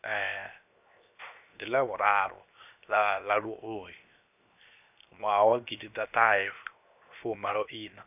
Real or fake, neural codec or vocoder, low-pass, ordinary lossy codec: fake; codec, 16 kHz, 0.7 kbps, FocalCodec; 3.6 kHz; none